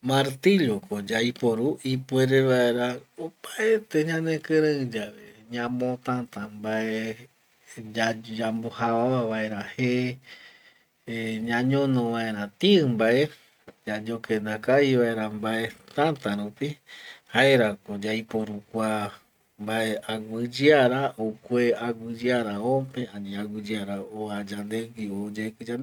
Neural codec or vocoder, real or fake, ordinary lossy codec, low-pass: none; real; none; 19.8 kHz